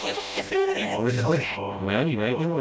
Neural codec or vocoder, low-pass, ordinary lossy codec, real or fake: codec, 16 kHz, 0.5 kbps, FreqCodec, smaller model; none; none; fake